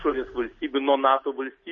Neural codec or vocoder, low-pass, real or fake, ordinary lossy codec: none; 7.2 kHz; real; MP3, 32 kbps